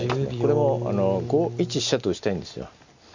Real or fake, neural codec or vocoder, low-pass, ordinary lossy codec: real; none; 7.2 kHz; Opus, 64 kbps